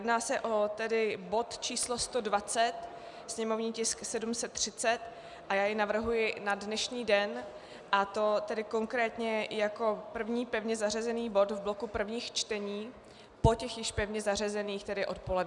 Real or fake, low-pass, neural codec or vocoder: real; 10.8 kHz; none